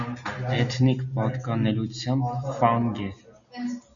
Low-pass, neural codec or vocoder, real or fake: 7.2 kHz; none; real